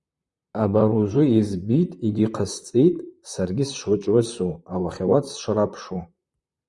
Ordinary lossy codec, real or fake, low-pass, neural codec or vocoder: Opus, 64 kbps; fake; 10.8 kHz; vocoder, 44.1 kHz, 128 mel bands, Pupu-Vocoder